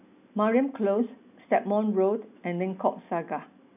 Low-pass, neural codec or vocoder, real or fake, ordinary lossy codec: 3.6 kHz; none; real; none